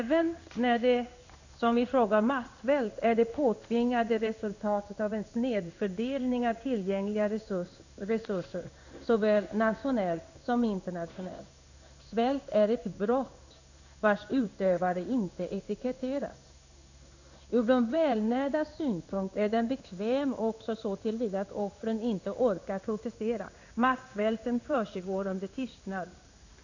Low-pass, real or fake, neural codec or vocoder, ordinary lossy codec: 7.2 kHz; fake; codec, 16 kHz in and 24 kHz out, 1 kbps, XY-Tokenizer; none